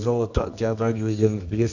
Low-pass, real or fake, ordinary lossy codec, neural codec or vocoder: 7.2 kHz; fake; none; codec, 24 kHz, 0.9 kbps, WavTokenizer, medium music audio release